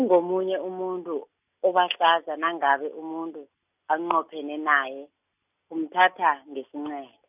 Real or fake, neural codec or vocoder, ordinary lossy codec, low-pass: real; none; none; 3.6 kHz